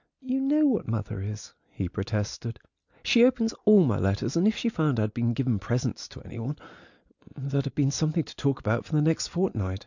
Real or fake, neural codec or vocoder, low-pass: real; none; 7.2 kHz